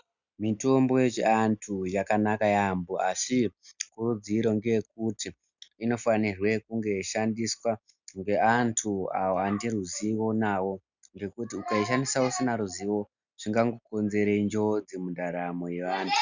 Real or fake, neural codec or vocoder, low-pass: real; none; 7.2 kHz